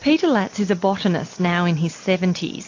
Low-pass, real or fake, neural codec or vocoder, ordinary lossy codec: 7.2 kHz; real; none; AAC, 48 kbps